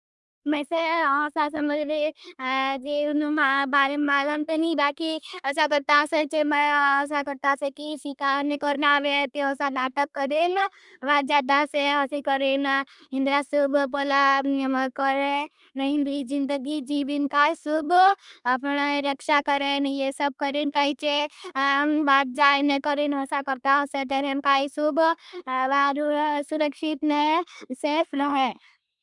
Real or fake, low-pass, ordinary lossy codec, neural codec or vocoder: fake; 10.8 kHz; none; codec, 24 kHz, 1 kbps, SNAC